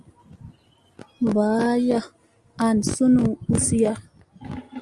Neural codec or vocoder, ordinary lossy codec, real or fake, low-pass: none; Opus, 32 kbps; real; 10.8 kHz